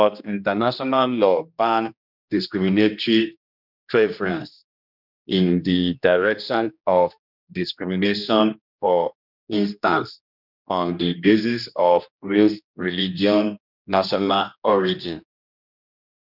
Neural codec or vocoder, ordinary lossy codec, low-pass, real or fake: codec, 16 kHz, 1 kbps, X-Codec, HuBERT features, trained on general audio; none; 5.4 kHz; fake